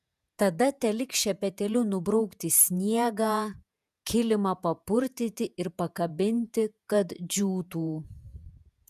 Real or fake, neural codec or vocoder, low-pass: fake; vocoder, 48 kHz, 128 mel bands, Vocos; 14.4 kHz